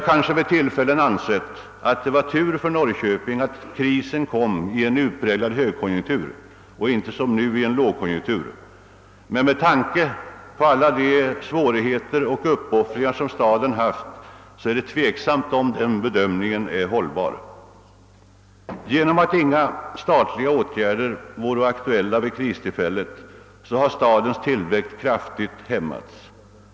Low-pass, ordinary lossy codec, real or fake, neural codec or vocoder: none; none; real; none